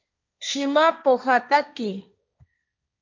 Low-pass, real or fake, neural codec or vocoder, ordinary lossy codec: 7.2 kHz; fake; codec, 16 kHz, 1.1 kbps, Voila-Tokenizer; MP3, 64 kbps